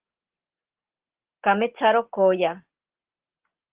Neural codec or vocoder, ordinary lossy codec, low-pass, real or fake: none; Opus, 16 kbps; 3.6 kHz; real